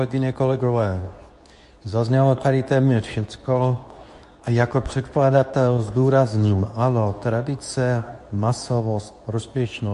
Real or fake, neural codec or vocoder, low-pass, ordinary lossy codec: fake; codec, 24 kHz, 0.9 kbps, WavTokenizer, medium speech release version 2; 10.8 kHz; AAC, 64 kbps